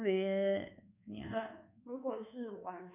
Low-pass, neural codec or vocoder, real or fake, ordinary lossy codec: 3.6 kHz; codec, 24 kHz, 1.2 kbps, DualCodec; fake; none